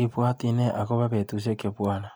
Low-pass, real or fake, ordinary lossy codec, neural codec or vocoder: none; real; none; none